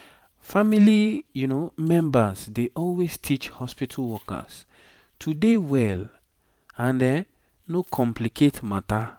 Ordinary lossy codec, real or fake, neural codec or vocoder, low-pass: none; fake; vocoder, 44.1 kHz, 128 mel bands every 512 samples, BigVGAN v2; 19.8 kHz